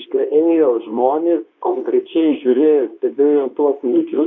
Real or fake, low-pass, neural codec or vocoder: fake; 7.2 kHz; codec, 24 kHz, 0.9 kbps, WavTokenizer, medium speech release version 2